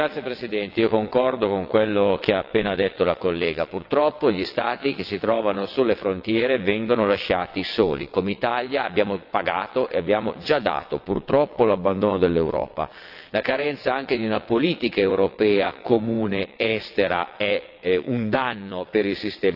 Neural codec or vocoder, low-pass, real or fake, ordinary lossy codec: vocoder, 22.05 kHz, 80 mel bands, WaveNeXt; 5.4 kHz; fake; AAC, 48 kbps